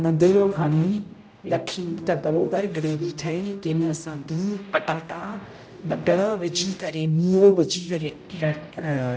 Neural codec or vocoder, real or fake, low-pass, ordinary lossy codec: codec, 16 kHz, 0.5 kbps, X-Codec, HuBERT features, trained on general audio; fake; none; none